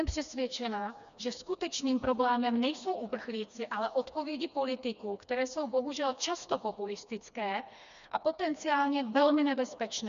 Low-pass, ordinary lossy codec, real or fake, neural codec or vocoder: 7.2 kHz; MP3, 96 kbps; fake; codec, 16 kHz, 2 kbps, FreqCodec, smaller model